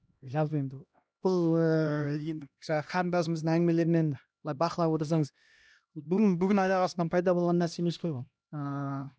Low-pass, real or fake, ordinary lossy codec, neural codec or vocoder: none; fake; none; codec, 16 kHz, 1 kbps, X-Codec, HuBERT features, trained on LibriSpeech